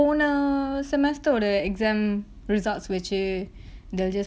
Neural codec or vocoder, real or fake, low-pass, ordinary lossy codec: none; real; none; none